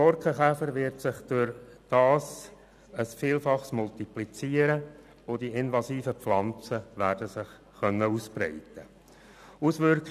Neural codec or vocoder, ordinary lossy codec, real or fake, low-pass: none; none; real; 14.4 kHz